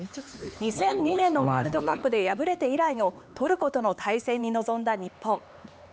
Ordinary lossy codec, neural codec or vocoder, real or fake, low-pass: none; codec, 16 kHz, 4 kbps, X-Codec, HuBERT features, trained on LibriSpeech; fake; none